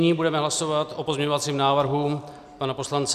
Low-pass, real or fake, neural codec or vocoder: 14.4 kHz; real; none